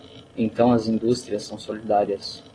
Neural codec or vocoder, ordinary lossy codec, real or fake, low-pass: none; AAC, 32 kbps; real; 9.9 kHz